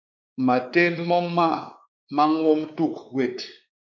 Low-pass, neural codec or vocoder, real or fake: 7.2 kHz; codec, 16 kHz, 2 kbps, X-Codec, WavLM features, trained on Multilingual LibriSpeech; fake